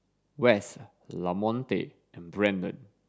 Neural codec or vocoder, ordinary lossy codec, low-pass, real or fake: none; none; none; real